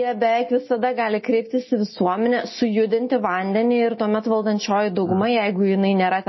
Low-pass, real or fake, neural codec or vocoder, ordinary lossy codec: 7.2 kHz; real; none; MP3, 24 kbps